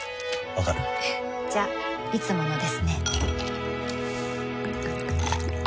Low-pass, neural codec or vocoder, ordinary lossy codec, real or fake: none; none; none; real